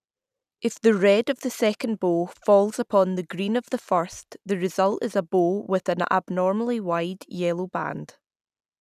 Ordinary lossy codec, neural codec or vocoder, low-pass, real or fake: none; none; 14.4 kHz; real